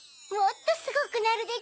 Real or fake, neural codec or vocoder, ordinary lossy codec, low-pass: real; none; none; none